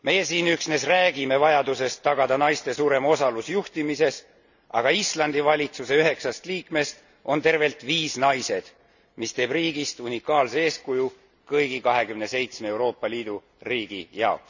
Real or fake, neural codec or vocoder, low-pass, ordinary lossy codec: real; none; 7.2 kHz; none